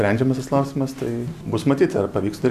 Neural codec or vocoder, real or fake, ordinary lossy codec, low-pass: none; real; AAC, 96 kbps; 14.4 kHz